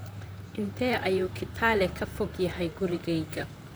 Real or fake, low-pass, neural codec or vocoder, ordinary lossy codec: fake; none; vocoder, 44.1 kHz, 128 mel bands, Pupu-Vocoder; none